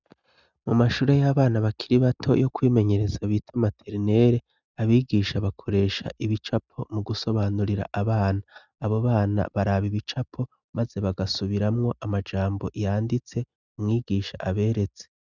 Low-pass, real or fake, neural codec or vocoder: 7.2 kHz; real; none